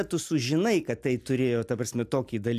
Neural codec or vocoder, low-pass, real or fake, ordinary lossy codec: none; 14.4 kHz; real; AAC, 96 kbps